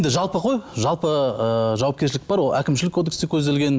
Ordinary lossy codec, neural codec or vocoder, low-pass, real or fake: none; none; none; real